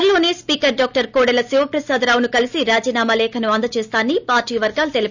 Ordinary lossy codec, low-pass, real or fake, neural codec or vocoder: none; 7.2 kHz; real; none